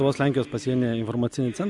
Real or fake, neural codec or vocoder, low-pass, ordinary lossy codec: real; none; 10.8 kHz; Opus, 64 kbps